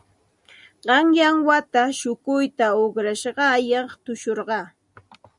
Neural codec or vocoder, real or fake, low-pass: none; real; 10.8 kHz